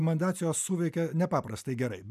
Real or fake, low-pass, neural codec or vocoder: real; 14.4 kHz; none